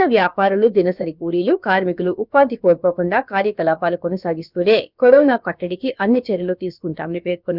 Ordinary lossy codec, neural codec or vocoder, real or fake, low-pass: none; codec, 16 kHz, about 1 kbps, DyCAST, with the encoder's durations; fake; 5.4 kHz